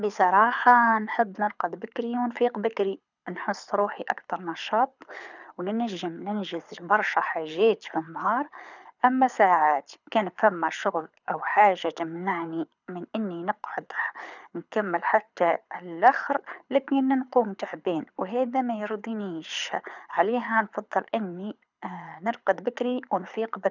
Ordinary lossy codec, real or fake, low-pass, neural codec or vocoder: none; fake; 7.2 kHz; codec, 24 kHz, 6 kbps, HILCodec